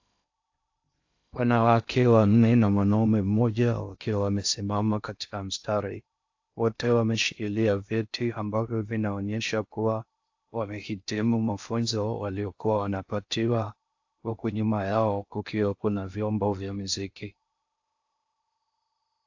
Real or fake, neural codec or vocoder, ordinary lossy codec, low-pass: fake; codec, 16 kHz in and 24 kHz out, 0.6 kbps, FocalCodec, streaming, 2048 codes; AAC, 48 kbps; 7.2 kHz